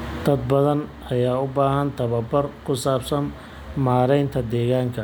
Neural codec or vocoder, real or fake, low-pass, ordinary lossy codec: none; real; none; none